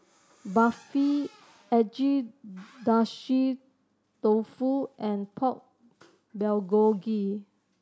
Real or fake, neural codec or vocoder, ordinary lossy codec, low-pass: real; none; none; none